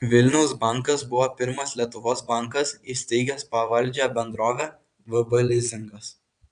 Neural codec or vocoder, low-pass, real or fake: vocoder, 22.05 kHz, 80 mel bands, Vocos; 9.9 kHz; fake